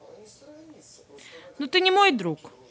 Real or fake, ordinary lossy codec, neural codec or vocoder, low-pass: real; none; none; none